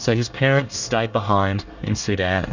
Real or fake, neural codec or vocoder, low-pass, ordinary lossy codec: fake; codec, 24 kHz, 1 kbps, SNAC; 7.2 kHz; Opus, 64 kbps